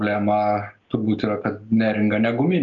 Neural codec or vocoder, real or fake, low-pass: none; real; 7.2 kHz